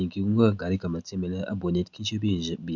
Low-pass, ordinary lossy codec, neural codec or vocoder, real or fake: 7.2 kHz; none; none; real